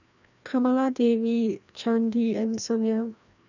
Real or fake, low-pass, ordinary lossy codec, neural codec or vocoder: fake; 7.2 kHz; none; codec, 16 kHz, 1 kbps, FreqCodec, larger model